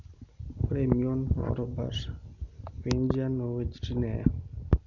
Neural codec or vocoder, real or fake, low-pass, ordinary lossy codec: none; real; 7.2 kHz; none